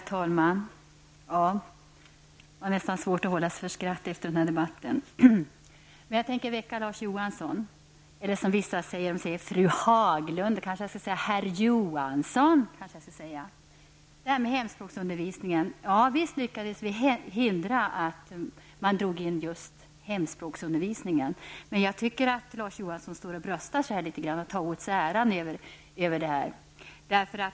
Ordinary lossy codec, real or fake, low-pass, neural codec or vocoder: none; real; none; none